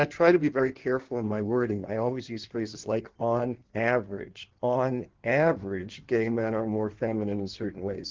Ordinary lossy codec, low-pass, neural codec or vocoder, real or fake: Opus, 16 kbps; 7.2 kHz; codec, 16 kHz in and 24 kHz out, 1.1 kbps, FireRedTTS-2 codec; fake